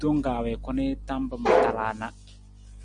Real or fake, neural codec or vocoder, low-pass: real; none; 9.9 kHz